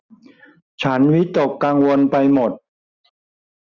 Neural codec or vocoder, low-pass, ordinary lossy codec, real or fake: none; 7.2 kHz; none; real